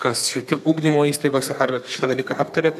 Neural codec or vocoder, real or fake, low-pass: codec, 32 kHz, 1.9 kbps, SNAC; fake; 14.4 kHz